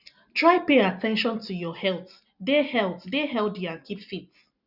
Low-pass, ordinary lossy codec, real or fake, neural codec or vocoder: 5.4 kHz; none; real; none